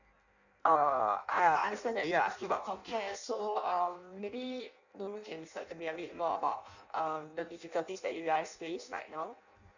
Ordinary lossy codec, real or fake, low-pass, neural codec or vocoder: none; fake; 7.2 kHz; codec, 16 kHz in and 24 kHz out, 0.6 kbps, FireRedTTS-2 codec